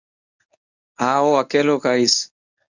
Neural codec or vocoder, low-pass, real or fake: codec, 24 kHz, 0.9 kbps, WavTokenizer, medium speech release version 1; 7.2 kHz; fake